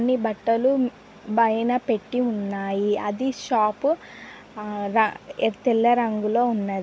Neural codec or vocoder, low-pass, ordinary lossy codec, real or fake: none; none; none; real